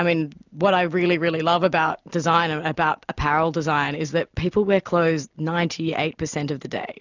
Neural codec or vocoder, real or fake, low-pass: none; real; 7.2 kHz